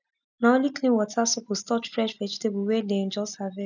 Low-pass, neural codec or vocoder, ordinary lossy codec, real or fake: 7.2 kHz; none; none; real